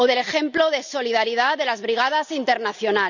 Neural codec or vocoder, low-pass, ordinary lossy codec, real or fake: none; 7.2 kHz; none; real